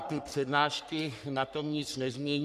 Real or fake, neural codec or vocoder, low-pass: fake; codec, 44.1 kHz, 3.4 kbps, Pupu-Codec; 14.4 kHz